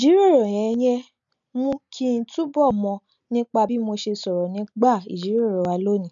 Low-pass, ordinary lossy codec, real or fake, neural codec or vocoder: 7.2 kHz; none; real; none